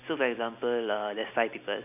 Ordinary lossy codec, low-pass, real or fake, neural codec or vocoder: none; 3.6 kHz; real; none